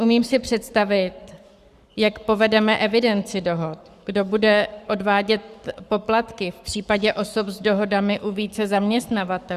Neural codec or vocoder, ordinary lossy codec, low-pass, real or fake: codec, 44.1 kHz, 7.8 kbps, Pupu-Codec; Opus, 64 kbps; 14.4 kHz; fake